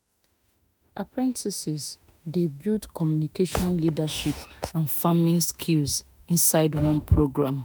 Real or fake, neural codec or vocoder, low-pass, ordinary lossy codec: fake; autoencoder, 48 kHz, 32 numbers a frame, DAC-VAE, trained on Japanese speech; none; none